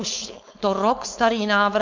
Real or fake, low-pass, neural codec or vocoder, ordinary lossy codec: fake; 7.2 kHz; codec, 16 kHz, 4.8 kbps, FACodec; MP3, 64 kbps